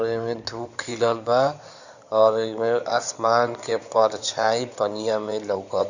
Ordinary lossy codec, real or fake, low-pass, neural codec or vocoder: none; fake; 7.2 kHz; codec, 16 kHz in and 24 kHz out, 2.2 kbps, FireRedTTS-2 codec